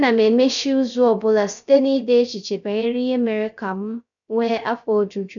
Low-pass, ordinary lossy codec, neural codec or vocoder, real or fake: 7.2 kHz; none; codec, 16 kHz, 0.3 kbps, FocalCodec; fake